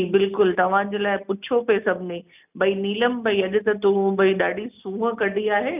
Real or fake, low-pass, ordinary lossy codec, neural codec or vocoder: real; 3.6 kHz; none; none